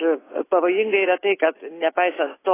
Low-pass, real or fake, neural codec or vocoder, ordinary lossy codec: 3.6 kHz; real; none; AAC, 16 kbps